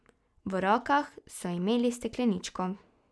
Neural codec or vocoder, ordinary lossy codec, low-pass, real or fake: none; none; none; real